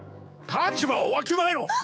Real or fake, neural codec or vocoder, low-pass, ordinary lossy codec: fake; codec, 16 kHz, 4 kbps, X-Codec, HuBERT features, trained on general audio; none; none